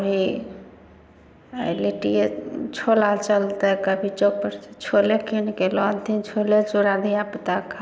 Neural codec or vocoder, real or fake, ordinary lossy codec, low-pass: none; real; none; none